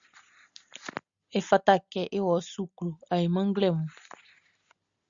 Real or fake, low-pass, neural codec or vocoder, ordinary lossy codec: real; 7.2 kHz; none; Opus, 64 kbps